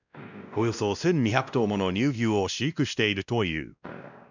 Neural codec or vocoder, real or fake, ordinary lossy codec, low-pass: codec, 16 kHz, 1 kbps, X-Codec, WavLM features, trained on Multilingual LibriSpeech; fake; none; 7.2 kHz